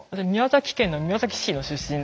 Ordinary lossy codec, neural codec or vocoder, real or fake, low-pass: none; none; real; none